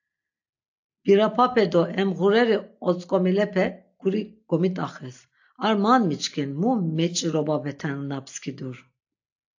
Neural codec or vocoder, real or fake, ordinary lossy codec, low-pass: none; real; AAC, 48 kbps; 7.2 kHz